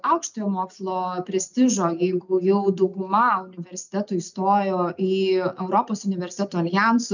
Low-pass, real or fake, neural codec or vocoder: 7.2 kHz; real; none